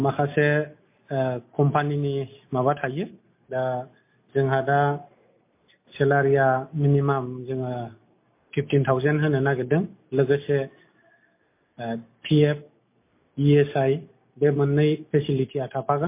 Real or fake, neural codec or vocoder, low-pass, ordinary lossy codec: real; none; 3.6 kHz; MP3, 24 kbps